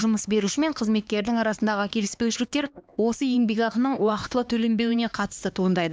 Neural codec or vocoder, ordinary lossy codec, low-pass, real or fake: codec, 16 kHz, 2 kbps, X-Codec, HuBERT features, trained on LibriSpeech; none; none; fake